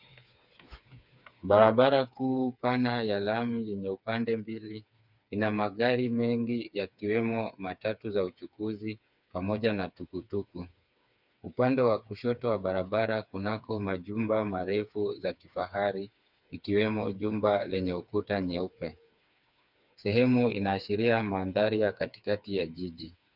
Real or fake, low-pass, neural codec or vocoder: fake; 5.4 kHz; codec, 16 kHz, 4 kbps, FreqCodec, smaller model